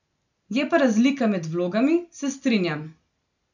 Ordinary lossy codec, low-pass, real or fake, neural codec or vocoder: none; 7.2 kHz; real; none